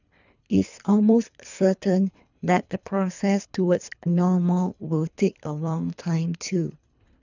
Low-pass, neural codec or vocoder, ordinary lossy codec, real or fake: 7.2 kHz; codec, 24 kHz, 3 kbps, HILCodec; none; fake